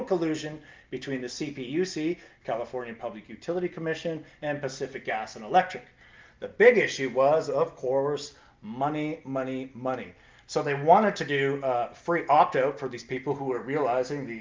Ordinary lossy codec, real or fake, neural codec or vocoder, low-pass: Opus, 24 kbps; real; none; 7.2 kHz